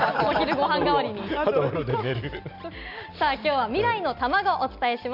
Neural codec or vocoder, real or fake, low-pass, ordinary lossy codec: none; real; 5.4 kHz; none